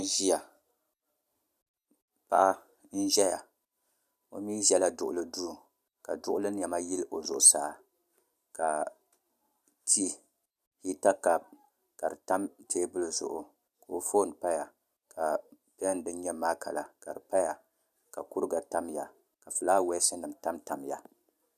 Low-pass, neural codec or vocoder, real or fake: 14.4 kHz; none; real